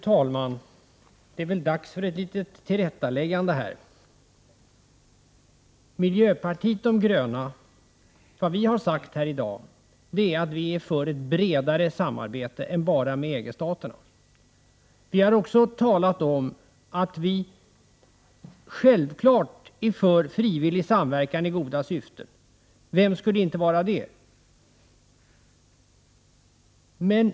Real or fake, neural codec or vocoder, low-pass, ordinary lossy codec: real; none; none; none